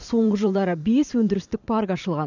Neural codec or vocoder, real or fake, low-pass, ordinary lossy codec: vocoder, 44.1 kHz, 128 mel bands every 512 samples, BigVGAN v2; fake; 7.2 kHz; none